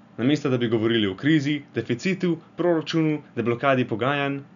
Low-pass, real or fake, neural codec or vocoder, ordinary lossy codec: 7.2 kHz; real; none; none